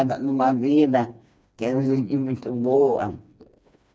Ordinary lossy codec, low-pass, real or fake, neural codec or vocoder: none; none; fake; codec, 16 kHz, 2 kbps, FreqCodec, smaller model